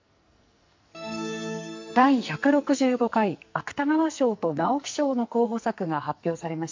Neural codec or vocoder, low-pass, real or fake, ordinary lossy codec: codec, 44.1 kHz, 2.6 kbps, SNAC; 7.2 kHz; fake; MP3, 48 kbps